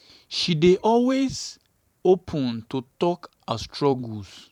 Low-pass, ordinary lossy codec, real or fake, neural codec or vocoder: 19.8 kHz; none; fake; vocoder, 44.1 kHz, 128 mel bands every 512 samples, BigVGAN v2